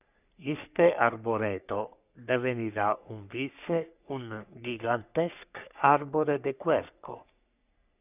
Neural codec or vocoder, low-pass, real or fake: codec, 44.1 kHz, 3.4 kbps, Pupu-Codec; 3.6 kHz; fake